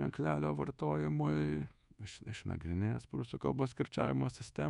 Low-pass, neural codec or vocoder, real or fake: 10.8 kHz; codec, 24 kHz, 1.2 kbps, DualCodec; fake